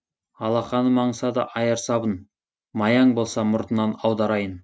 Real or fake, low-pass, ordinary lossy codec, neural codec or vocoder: real; none; none; none